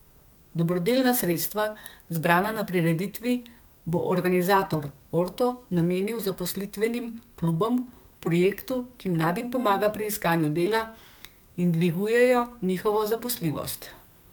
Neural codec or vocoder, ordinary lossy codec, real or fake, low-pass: codec, 44.1 kHz, 2.6 kbps, SNAC; none; fake; none